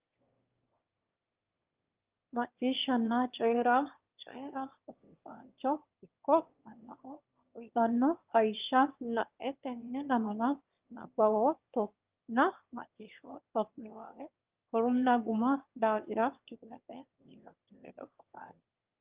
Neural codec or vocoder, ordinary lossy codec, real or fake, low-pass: autoencoder, 22.05 kHz, a latent of 192 numbers a frame, VITS, trained on one speaker; Opus, 32 kbps; fake; 3.6 kHz